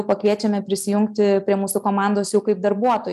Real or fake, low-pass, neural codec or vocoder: real; 14.4 kHz; none